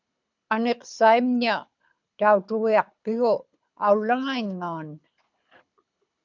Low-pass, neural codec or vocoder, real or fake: 7.2 kHz; codec, 24 kHz, 6 kbps, HILCodec; fake